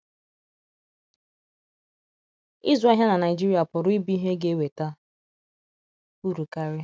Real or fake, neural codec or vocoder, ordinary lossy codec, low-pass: real; none; none; none